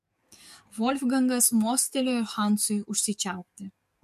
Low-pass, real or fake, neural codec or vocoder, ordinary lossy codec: 14.4 kHz; fake; codec, 44.1 kHz, 7.8 kbps, DAC; MP3, 64 kbps